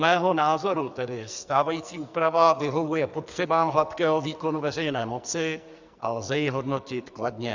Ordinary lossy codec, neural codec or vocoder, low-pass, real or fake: Opus, 64 kbps; codec, 44.1 kHz, 2.6 kbps, SNAC; 7.2 kHz; fake